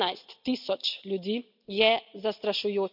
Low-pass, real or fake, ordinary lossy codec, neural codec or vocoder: 5.4 kHz; real; none; none